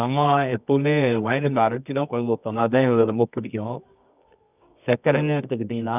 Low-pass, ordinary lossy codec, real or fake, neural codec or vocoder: 3.6 kHz; none; fake; codec, 24 kHz, 0.9 kbps, WavTokenizer, medium music audio release